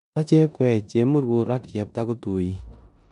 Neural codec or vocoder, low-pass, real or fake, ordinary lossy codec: codec, 16 kHz in and 24 kHz out, 0.9 kbps, LongCat-Audio-Codec, four codebook decoder; 10.8 kHz; fake; none